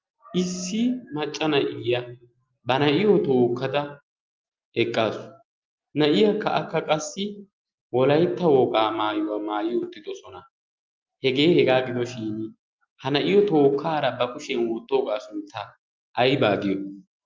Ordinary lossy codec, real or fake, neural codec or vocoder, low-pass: Opus, 32 kbps; real; none; 7.2 kHz